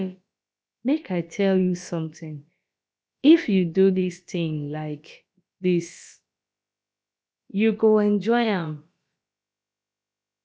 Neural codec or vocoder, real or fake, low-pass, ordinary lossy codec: codec, 16 kHz, about 1 kbps, DyCAST, with the encoder's durations; fake; none; none